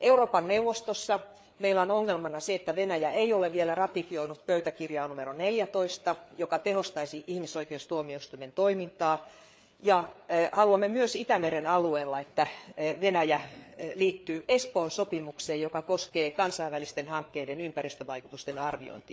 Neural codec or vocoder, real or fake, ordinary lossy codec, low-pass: codec, 16 kHz, 4 kbps, FreqCodec, larger model; fake; none; none